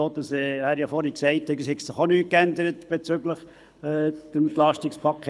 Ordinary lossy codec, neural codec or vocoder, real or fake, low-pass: none; codec, 24 kHz, 6 kbps, HILCodec; fake; none